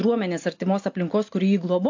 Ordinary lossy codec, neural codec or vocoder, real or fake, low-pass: AAC, 48 kbps; none; real; 7.2 kHz